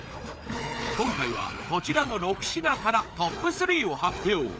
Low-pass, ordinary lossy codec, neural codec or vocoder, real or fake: none; none; codec, 16 kHz, 4 kbps, FreqCodec, larger model; fake